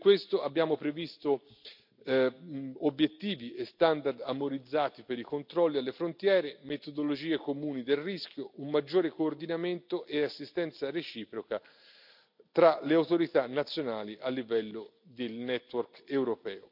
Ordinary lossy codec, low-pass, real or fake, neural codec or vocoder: none; 5.4 kHz; real; none